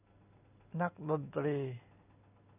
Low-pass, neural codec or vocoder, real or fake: 3.6 kHz; none; real